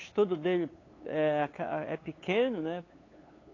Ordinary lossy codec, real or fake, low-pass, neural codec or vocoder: AAC, 32 kbps; fake; 7.2 kHz; codec, 16 kHz, 8 kbps, FunCodec, trained on LibriTTS, 25 frames a second